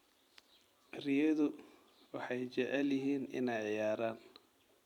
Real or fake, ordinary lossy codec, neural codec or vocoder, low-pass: fake; none; vocoder, 44.1 kHz, 128 mel bands every 256 samples, BigVGAN v2; 19.8 kHz